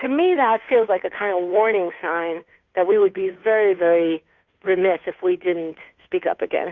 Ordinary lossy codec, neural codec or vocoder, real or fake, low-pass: Opus, 64 kbps; codec, 16 kHz, 2 kbps, FunCodec, trained on Chinese and English, 25 frames a second; fake; 7.2 kHz